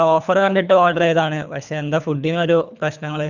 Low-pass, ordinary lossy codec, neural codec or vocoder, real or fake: 7.2 kHz; none; codec, 24 kHz, 3 kbps, HILCodec; fake